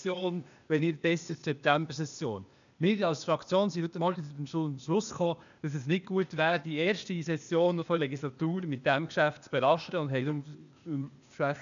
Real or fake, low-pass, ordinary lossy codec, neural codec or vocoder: fake; 7.2 kHz; MP3, 96 kbps; codec, 16 kHz, 0.8 kbps, ZipCodec